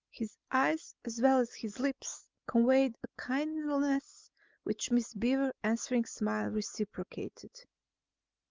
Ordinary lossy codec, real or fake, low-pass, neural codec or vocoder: Opus, 32 kbps; real; 7.2 kHz; none